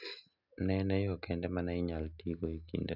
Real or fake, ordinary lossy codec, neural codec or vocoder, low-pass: real; none; none; 5.4 kHz